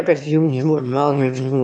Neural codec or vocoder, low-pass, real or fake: autoencoder, 22.05 kHz, a latent of 192 numbers a frame, VITS, trained on one speaker; 9.9 kHz; fake